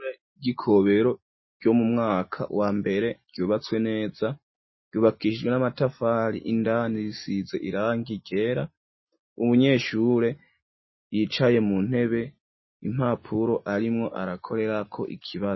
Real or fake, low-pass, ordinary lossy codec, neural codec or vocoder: real; 7.2 kHz; MP3, 24 kbps; none